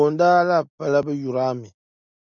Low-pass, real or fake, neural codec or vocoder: 7.2 kHz; real; none